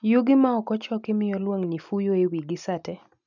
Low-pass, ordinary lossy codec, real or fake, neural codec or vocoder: 7.2 kHz; none; real; none